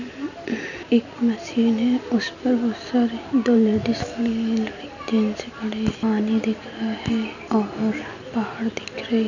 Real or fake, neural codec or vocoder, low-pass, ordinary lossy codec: real; none; 7.2 kHz; none